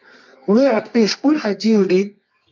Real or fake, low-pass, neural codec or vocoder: fake; 7.2 kHz; codec, 24 kHz, 0.9 kbps, WavTokenizer, medium music audio release